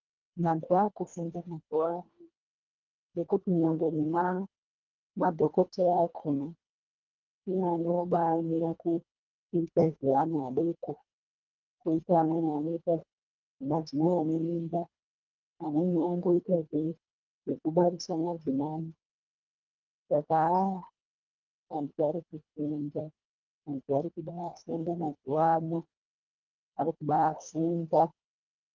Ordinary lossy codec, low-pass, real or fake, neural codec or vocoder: Opus, 32 kbps; 7.2 kHz; fake; codec, 24 kHz, 1.5 kbps, HILCodec